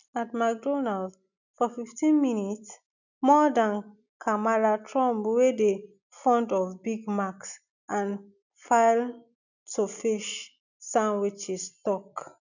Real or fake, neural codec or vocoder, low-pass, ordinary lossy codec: real; none; 7.2 kHz; none